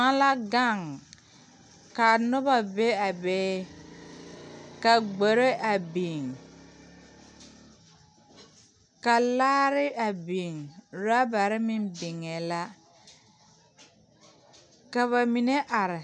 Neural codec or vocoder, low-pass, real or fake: none; 9.9 kHz; real